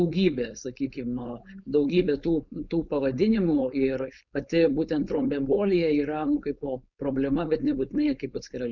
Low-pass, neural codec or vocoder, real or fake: 7.2 kHz; codec, 16 kHz, 4.8 kbps, FACodec; fake